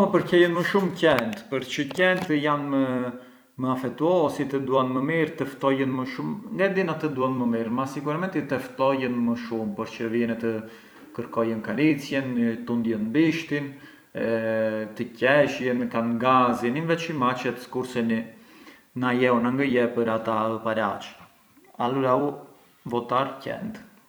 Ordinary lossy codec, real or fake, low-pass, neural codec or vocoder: none; fake; none; vocoder, 44.1 kHz, 128 mel bands every 256 samples, BigVGAN v2